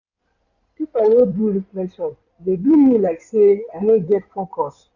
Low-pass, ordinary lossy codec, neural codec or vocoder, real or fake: 7.2 kHz; none; codec, 16 kHz in and 24 kHz out, 2.2 kbps, FireRedTTS-2 codec; fake